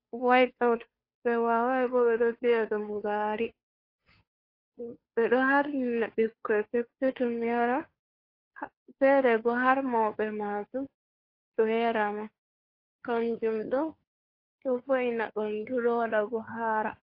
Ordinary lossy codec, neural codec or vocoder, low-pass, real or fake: AAC, 32 kbps; codec, 16 kHz, 2 kbps, FunCodec, trained on Chinese and English, 25 frames a second; 5.4 kHz; fake